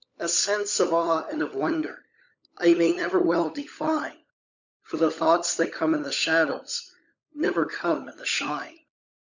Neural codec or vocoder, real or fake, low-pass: codec, 16 kHz, 16 kbps, FunCodec, trained on LibriTTS, 50 frames a second; fake; 7.2 kHz